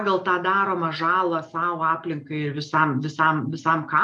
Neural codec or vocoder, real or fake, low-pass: none; real; 10.8 kHz